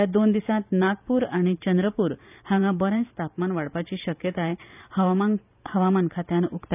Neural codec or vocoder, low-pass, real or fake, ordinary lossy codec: vocoder, 44.1 kHz, 128 mel bands every 512 samples, BigVGAN v2; 3.6 kHz; fake; none